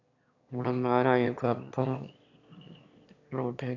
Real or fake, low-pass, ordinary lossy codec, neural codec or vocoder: fake; 7.2 kHz; AAC, 48 kbps; autoencoder, 22.05 kHz, a latent of 192 numbers a frame, VITS, trained on one speaker